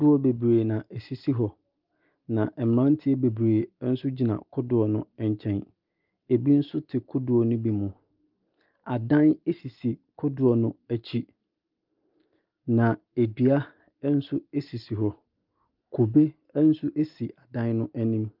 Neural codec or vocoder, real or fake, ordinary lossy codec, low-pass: none; real; Opus, 32 kbps; 5.4 kHz